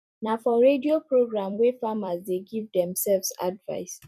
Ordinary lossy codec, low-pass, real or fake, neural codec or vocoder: none; 14.4 kHz; fake; vocoder, 44.1 kHz, 128 mel bands every 256 samples, BigVGAN v2